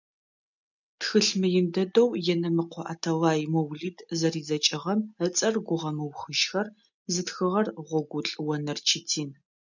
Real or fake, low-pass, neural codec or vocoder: real; 7.2 kHz; none